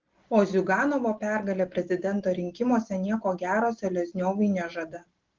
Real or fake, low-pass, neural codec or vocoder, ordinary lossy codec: real; 7.2 kHz; none; Opus, 16 kbps